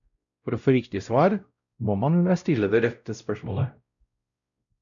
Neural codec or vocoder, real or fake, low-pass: codec, 16 kHz, 0.5 kbps, X-Codec, WavLM features, trained on Multilingual LibriSpeech; fake; 7.2 kHz